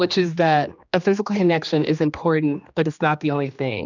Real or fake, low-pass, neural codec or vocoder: fake; 7.2 kHz; codec, 16 kHz, 2 kbps, X-Codec, HuBERT features, trained on general audio